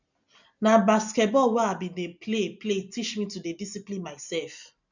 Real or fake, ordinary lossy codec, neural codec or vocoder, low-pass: real; none; none; 7.2 kHz